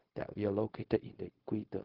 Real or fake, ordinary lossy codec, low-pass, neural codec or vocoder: fake; Opus, 16 kbps; 5.4 kHz; codec, 16 kHz, 0.4 kbps, LongCat-Audio-Codec